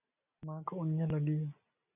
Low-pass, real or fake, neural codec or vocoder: 3.6 kHz; real; none